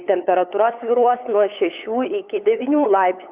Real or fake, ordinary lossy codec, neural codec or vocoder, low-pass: fake; Opus, 64 kbps; codec, 16 kHz, 8 kbps, FunCodec, trained on LibriTTS, 25 frames a second; 3.6 kHz